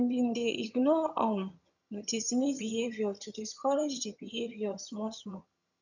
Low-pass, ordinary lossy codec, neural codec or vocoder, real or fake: 7.2 kHz; none; vocoder, 22.05 kHz, 80 mel bands, HiFi-GAN; fake